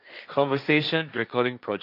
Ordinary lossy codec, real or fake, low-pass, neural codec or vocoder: none; fake; 5.4 kHz; codec, 16 kHz, 1.1 kbps, Voila-Tokenizer